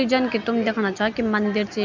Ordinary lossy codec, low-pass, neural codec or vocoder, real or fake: MP3, 64 kbps; 7.2 kHz; none; real